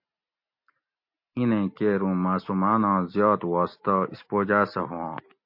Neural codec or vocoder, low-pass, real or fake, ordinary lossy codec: none; 5.4 kHz; real; MP3, 32 kbps